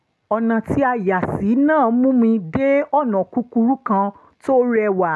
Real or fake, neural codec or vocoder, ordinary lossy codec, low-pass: real; none; none; none